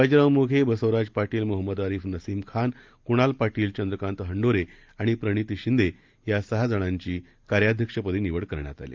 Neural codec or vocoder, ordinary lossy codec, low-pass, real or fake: none; Opus, 24 kbps; 7.2 kHz; real